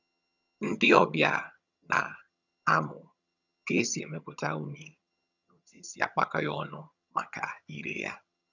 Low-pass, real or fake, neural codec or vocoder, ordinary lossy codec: 7.2 kHz; fake; vocoder, 22.05 kHz, 80 mel bands, HiFi-GAN; none